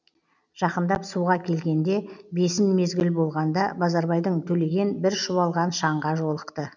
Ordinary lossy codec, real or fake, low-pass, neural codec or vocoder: none; real; 7.2 kHz; none